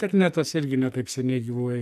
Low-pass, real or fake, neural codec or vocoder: 14.4 kHz; fake; codec, 44.1 kHz, 2.6 kbps, SNAC